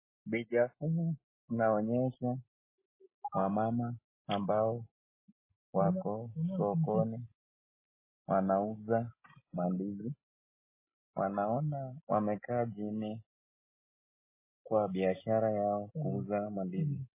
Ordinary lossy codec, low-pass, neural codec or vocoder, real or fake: MP3, 16 kbps; 3.6 kHz; none; real